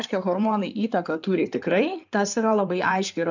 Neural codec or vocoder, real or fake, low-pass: codec, 16 kHz in and 24 kHz out, 2.2 kbps, FireRedTTS-2 codec; fake; 7.2 kHz